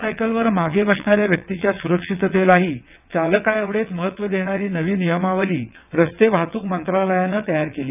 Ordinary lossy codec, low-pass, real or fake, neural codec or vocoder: none; 3.6 kHz; fake; vocoder, 22.05 kHz, 80 mel bands, WaveNeXt